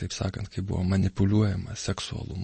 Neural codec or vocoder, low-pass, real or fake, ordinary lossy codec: none; 10.8 kHz; real; MP3, 32 kbps